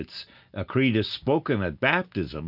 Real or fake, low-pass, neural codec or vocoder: real; 5.4 kHz; none